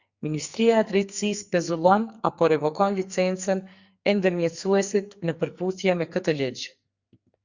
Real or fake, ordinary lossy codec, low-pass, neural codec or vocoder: fake; Opus, 64 kbps; 7.2 kHz; codec, 44.1 kHz, 2.6 kbps, SNAC